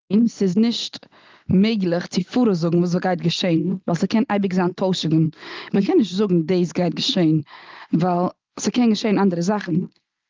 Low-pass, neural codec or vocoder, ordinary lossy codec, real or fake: 7.2 kHz; none; Opus, 32 kbps; real